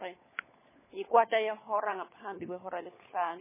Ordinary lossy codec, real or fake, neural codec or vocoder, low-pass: MP3, 16 kbps; fake; codec, 16 kHz, 16 kbps, FunCodec, trained on LibriTTS, 50 frames a second; 3.6 kHz